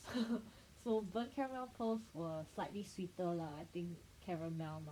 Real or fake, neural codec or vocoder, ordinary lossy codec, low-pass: fake; vocoder, 44.1 kHz, 128 mel bands, Pupu-Vocoder; none; 19.8 kHz